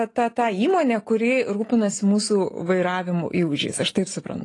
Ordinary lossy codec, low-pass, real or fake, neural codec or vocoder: AAC, 32 kbps; 10.8 kHz; real; none